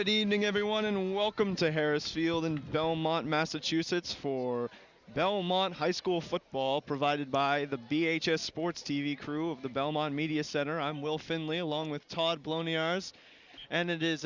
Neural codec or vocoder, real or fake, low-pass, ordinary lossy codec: none; real; 7.2 kHz; Opus, 64 kbps